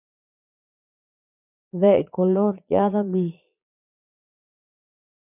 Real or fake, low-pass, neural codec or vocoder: fake; 3.6 kHz; vocoder, 22.05 kHz, 80 mel bands, Vocos